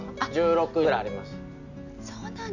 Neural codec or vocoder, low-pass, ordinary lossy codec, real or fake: none; 7.2 kHz; none; real